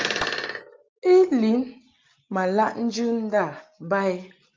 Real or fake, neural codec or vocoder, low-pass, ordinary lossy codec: real; none; 7.2 kHz; Opus, 24 kbps